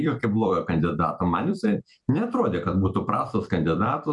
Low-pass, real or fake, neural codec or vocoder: 10.8 kHz; fake; vocoder, 44.1 kHz, 128 mel bands every 256 samples, BigVGAN v2